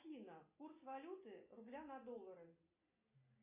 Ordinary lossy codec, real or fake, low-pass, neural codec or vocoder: AAC, 32 kbps; real; 3.6 kHz; none